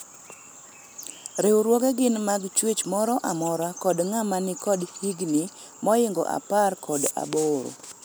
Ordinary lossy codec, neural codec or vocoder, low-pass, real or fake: none; none; none; real